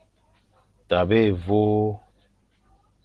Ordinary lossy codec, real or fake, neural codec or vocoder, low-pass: Opus, 16 kbps; real; none; 10.8 kHz